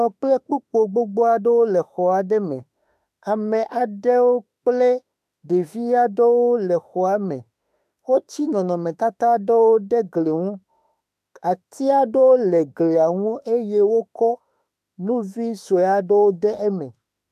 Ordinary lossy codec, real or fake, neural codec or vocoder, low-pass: AAC, 96 kbps; fake; autoencoder, 48 kHz, 32 numbers a frame, DAC-VAE, trained on Japanese speech; 14.4 kHz